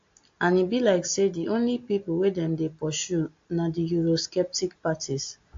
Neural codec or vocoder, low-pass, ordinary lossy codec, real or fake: none; 7.2 kHz; AAC, 48 kbps; real